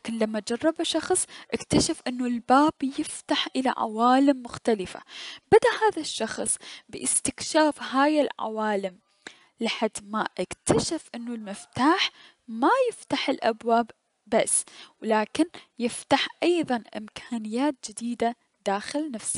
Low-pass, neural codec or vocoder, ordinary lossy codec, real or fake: 10.8 kHz; none; none; real